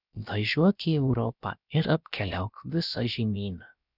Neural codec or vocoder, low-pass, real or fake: codec, 16 kHz, about 1 kbps, DyCAST, with the encoder's durations; 5.4 kHz; fake